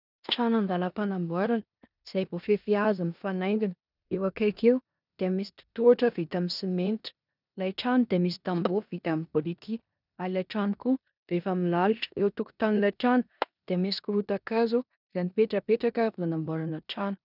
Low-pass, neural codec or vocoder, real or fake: 5.4 kHz; codec, 16 kHz in and 24 kHz out, 0.9 kbps, LongCat-Audio-Codec, four codebook decoder; fake